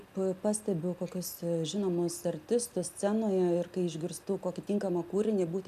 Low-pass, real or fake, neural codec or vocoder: 14.4 kHz; real; none